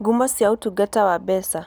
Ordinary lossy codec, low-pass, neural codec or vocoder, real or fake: none; none; none; real